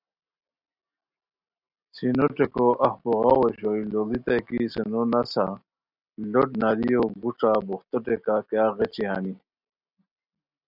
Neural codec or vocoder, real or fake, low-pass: none; real; 5.4 kHz